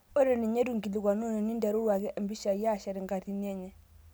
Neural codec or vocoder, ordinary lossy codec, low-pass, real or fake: none; none; none; real